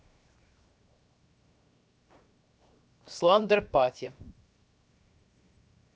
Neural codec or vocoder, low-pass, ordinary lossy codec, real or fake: codec, 16 kHz, 0.7 kbps, FocalCodec; none; none; fake